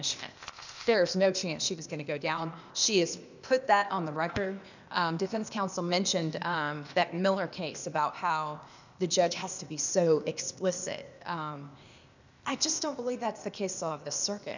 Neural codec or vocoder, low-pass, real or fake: codec, 16 kHz, 0.8 kbps, ZipCodec; 7.2 kHz; fake